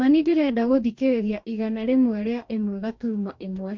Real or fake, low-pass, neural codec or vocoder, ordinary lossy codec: fake; 7.2 kHz; codec, 44.1 kHz, 2.6 kbps, DAC; MP3, 48 kbps